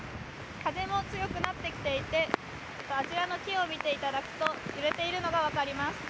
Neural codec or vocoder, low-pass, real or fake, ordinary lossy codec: none; none; real; none